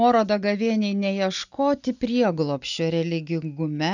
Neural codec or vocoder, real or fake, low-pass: none; real; 7.2 kHz